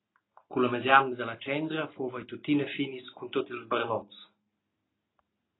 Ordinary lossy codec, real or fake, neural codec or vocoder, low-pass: AAC, 16 kbps; real; none; 7.2 kHz